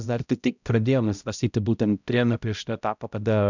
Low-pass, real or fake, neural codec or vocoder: 7.2 kHz; fake; codec, 16 kHz, 0.5 kbps, X-Codec, HuBERT features, trained on balanced general audio